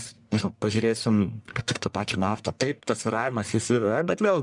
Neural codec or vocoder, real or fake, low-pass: codec, 44.1 kHz, 1.7 kbps, Pupu-Codec; fake; 10.8 kHz